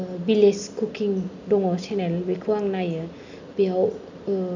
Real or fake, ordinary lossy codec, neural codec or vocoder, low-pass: real; none; none; 7.2 kHz